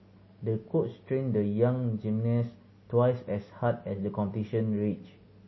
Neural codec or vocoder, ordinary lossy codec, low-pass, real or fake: none; MP3, 24 kbps; 7.2 kHz; real